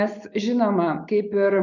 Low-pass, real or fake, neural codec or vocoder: 7.2 kHz; real; none